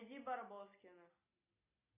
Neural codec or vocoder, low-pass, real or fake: none; 3.6 kHz; real